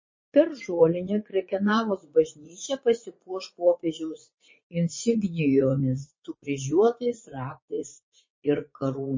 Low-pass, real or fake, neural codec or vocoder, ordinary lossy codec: 7.2 kHz; fake; vocoder, 44.1 kHz, 80 mel bands, Vocos; MP3, 32 kbps